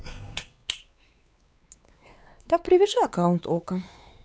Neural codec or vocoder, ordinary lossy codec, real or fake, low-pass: codec, 16 kHz, 4 kbps, X-Codec, WavLM features, trained on Multilingual LibriSpeech; none; fake; none